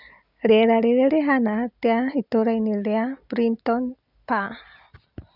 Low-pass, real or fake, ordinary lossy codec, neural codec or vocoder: 5.4 kHz; real; none; none